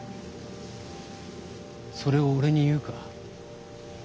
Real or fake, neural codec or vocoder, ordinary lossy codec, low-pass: real; none; none; none